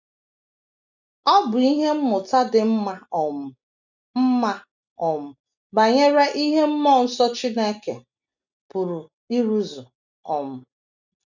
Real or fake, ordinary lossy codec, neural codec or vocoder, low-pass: real; none; none; 7.2 kHz